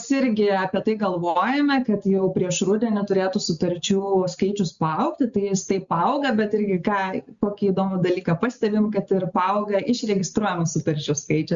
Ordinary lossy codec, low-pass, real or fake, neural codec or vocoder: Opus, 64 kbps; 7.2 kHz; real; none